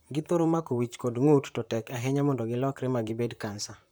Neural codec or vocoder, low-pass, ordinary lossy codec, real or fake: vocoder, 44.1 kHz, 128 mel bands, Pupu-Vocoder; none; none; fake